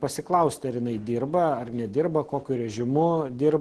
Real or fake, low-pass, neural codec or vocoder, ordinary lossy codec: real; 10.8 kHz; none; Opus, 16 kbps